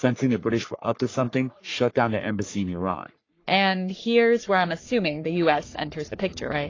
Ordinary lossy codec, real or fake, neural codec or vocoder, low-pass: AAC, 32 kbps; fake; codec, 44.1 kHz, 3.4 kbps, Pupu-Codec; 7.2 kHz